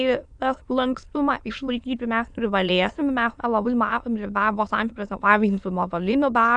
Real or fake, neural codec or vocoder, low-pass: fake; autoencoder, 22.05 kHz, a latent of 192 numbers a frame, VITS, trained on many speakers; 9.9 kHz